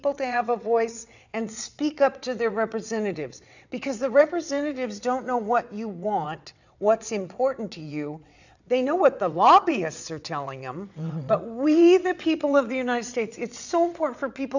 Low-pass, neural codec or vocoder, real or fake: 7.2 kHz; vocoder, 22.05 kHz, 80 mel bands, WaveNeXt; fake